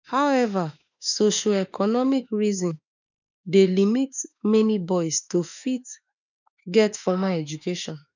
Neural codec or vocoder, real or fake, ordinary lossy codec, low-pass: autoencoder, 48 kHz, 32 numbers a frame, DAC-VAE, trained on Japanese speech; fake; none; 7.2 kHz